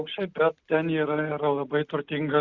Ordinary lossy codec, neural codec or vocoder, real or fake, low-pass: Opus, 64 kbps; none; real; 7.2 kHz